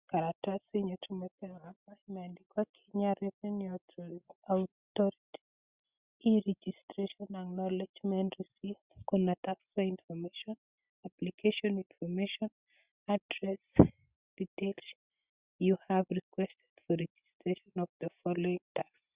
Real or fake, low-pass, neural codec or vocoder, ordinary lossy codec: real; 3.6 kHz; none; Opus, 64 kbps